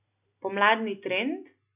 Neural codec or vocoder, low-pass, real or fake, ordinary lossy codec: none; 3.6 kHz; real; none